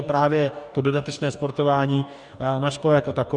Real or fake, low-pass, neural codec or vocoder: fake; 10.8 kHz; codec, 44.1 kHz, 2.6 kbps, DAC